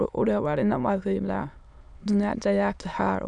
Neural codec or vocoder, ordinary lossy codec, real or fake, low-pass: autoencoder, 22.05 kHz, a latent of 192 numbers a frame, VITS, trained on many speakers; none; fake; 9.9 kHz